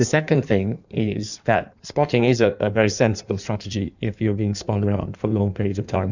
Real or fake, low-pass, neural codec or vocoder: fake; 7.2 kHz; codec, 16 kHz in and 24 kHz out, 1.1 kbps, FireRedTTS-2 codec